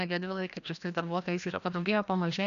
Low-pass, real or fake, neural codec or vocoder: 7.2 kHz; fake; codec, 16 kHz, 1 kbps, FreqCodec, larger model